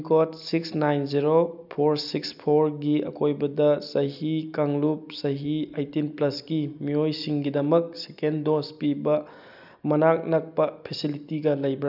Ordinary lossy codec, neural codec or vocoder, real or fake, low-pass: none; none; real; 5.4 kHz